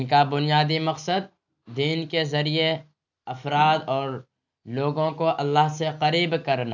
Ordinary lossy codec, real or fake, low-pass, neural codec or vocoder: none; real; 7.2 kHz; none